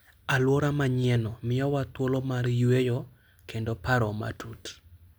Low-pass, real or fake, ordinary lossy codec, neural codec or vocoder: none; real; none; none